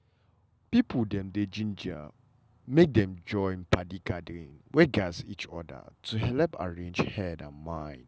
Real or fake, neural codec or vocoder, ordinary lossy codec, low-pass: real; none; none; none